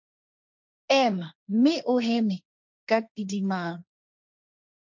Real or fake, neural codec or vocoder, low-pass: fake; codec, 16 kHz, 1.1 kbps, Voila-Tokenizer; 7.2 kHz